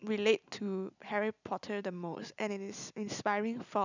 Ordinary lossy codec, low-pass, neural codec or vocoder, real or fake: none; 7.2 kHz; none; real